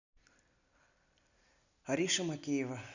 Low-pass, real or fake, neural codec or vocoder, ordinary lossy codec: 7.2 kHz; real; none; none